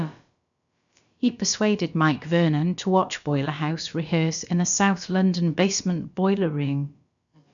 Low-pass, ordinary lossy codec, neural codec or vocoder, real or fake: 7.2 kHz; none; codec, 16 kHz, about 1 kbps, DyCAST, with the encoder's durations; fake